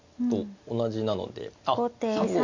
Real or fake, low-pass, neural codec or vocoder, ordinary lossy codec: real; 7.2 kHz; none; MP3, 48 kbps